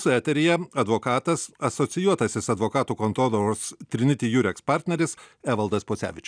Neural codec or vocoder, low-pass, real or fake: none; 9.9 kHz; real